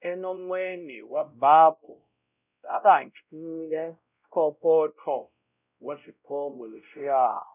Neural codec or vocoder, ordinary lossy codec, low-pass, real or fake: codec, 16 kHz, 0.5 kbps, X-Codec, WavLM features, trained on Multilingual LibriSpeech; none; 3.6 kHz; fake